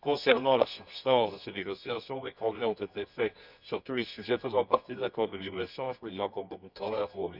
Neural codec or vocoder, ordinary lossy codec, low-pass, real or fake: codec, 24 kHz, 0.9 kbps, WavTokenizer, medium music audio release; none; 5.4 kHz; fake